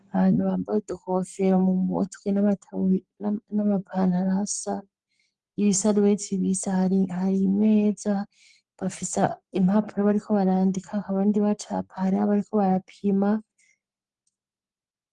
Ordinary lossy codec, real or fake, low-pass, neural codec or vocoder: Opus, 24 kbps; fake; 10.8 kHz; codec, 44.1 kHz, 7.8 kbps, Pupu-Codec